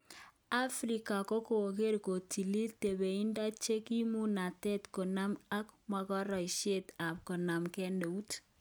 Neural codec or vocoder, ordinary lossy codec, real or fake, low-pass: none; none; real; none